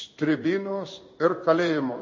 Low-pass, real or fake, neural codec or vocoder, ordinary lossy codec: 7.2 kHz; fake; codec, 16 kHz in and 24 kHz out, 1 kbps, XY-Tokenizer; MP3, 32 kbps